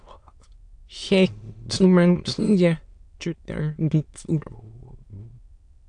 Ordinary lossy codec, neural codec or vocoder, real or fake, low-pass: AAC, 64 kbps; autoencoder, 22.05 kHz, a latent of 192 numbers a frame, VITS, trained on many speakers; fake; 9.9 kHz